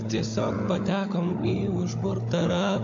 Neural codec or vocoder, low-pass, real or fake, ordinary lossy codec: codec, 16 kHz, 16 kbps, FunCodec, trained on Chinese and English, 50 frames a second; 7.2 kHz; fake; AAC, 48 kbps